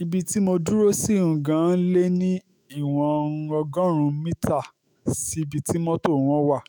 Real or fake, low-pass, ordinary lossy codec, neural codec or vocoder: fake; none; none; autoencoder, 48 kHz, 128 numbers a frame, DAC-VAE, trained on Japanese speech